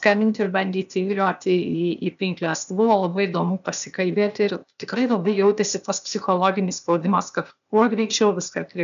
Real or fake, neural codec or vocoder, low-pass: fake; codec, 16 kHz, 0.8 kbps, ZipCodec; 7.2 kHz